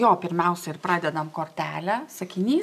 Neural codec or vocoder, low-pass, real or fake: none; 14.4 kHz; real